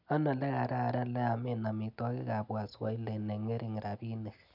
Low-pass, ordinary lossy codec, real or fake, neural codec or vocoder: 5.4 kHz; none; real; none